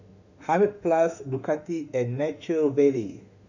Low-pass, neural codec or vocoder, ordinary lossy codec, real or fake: 7.2 kHz; autoencoder, 48 kHz, 32 numbers a frame, DAC-VAE, trained on Japanese speech; none; fake